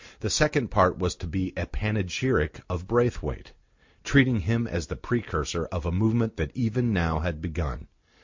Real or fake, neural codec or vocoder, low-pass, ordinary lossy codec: real; none; 7.2 kHz; MP3, 48 kbps